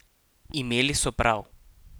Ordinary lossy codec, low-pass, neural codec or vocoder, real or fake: none; none; none; real